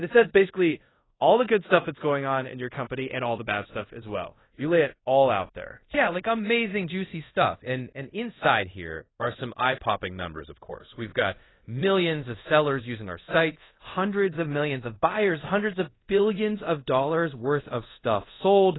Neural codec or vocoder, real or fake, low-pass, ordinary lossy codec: codec, 24 kHz, 0.5 kbps, DualCodec; fake; 7.2 kHz; AAC, 16 kbps